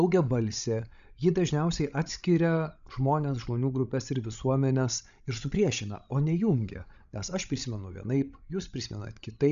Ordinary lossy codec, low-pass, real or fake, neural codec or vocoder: MP3, 96 kbps; 7.2 kHz; fake; codec, 16 kHz, 16 kbps, FreqCodec, larger model